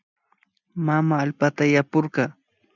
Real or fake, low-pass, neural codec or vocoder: real; 7.2 kHz; none